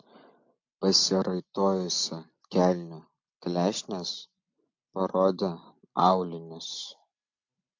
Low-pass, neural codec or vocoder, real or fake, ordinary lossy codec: 7.2 kHz; none; real; MP3, 48 kbps